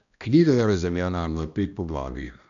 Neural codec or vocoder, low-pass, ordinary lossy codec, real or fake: codec, 16 kHz, 1 kbps, X-Codec, HuBERT features, trained on balanced general audio; 7.2 kHz; none; fake